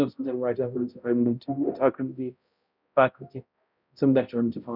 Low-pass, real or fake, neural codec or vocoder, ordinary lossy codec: 5.4 kHz; fake; codec, 16 kHz, 0.5 kbps, X-Codec, HuBERT features, trained on balanced general audio; none